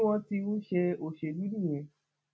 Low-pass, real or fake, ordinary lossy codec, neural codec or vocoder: none; real; none; none